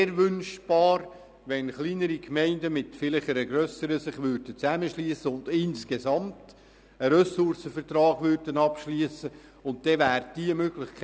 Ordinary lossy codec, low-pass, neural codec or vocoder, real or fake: none; none; none; real